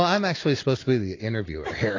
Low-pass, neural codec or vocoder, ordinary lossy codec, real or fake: 7.2 kHz; none; AAC, 32 kbps; real